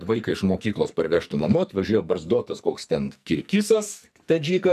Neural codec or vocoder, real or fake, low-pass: codec, 44.1 kHz, 2.6 kbps, SNAC; fake; 14.4 kHz